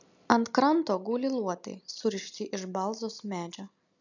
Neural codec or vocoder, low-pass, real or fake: none; 7.2 kHz; real